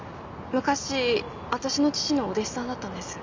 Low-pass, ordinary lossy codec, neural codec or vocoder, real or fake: 7.2 kHz; MP3, 64 kbps; none; real